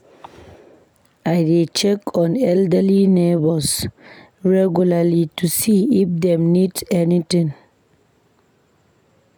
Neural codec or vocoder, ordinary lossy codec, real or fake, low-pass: none; none; real; none